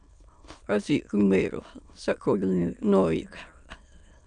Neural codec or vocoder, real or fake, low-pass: autoencoder, 22.05 kHz, a latent of 192 numbers a frame, VITS, trained on many speakers; fake; 9.9 kHz